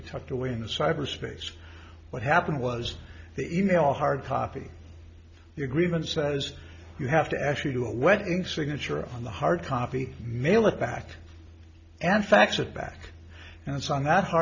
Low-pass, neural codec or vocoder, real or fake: 7.2 kHz; none; real